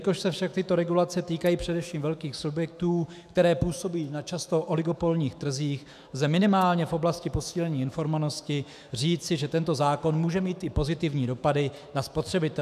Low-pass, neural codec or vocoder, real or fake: 14.4 kHz; autoencoder, 48 kHz, 128 numbers a frame, DAC-VAE, trained on Japanese speech; fake